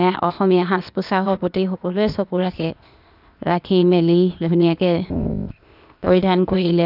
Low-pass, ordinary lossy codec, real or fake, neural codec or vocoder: 5.4 kHz; none; fake; codec, 16 kHz, 0.8 kbps, ZipCodec